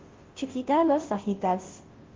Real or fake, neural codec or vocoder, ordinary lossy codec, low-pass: fake; codec, 16 kHz, 0.5 kbps, FunCodec, trained on Chinese and English, 25 frames a second; Opus, 16 kbps; 7.2 kHz